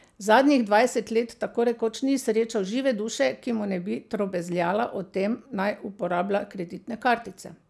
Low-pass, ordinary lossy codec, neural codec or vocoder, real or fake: none; none; none; real